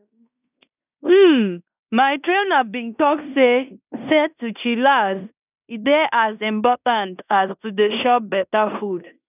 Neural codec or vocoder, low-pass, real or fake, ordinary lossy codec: codec, 16 kHz in and 24 kHz out, 0.9 kbps, LongCat-Audio-Codec, fine tuned four codebook decoder; 3.6 kHz; fake; none